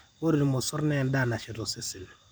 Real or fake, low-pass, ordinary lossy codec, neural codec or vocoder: fake; none; none; vocoder, 44.1 kHz, 128 mel bands every 256 samples, BigVGAN v2